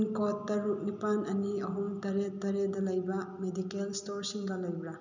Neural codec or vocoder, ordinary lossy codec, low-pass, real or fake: none; none; 7.2 kHz; real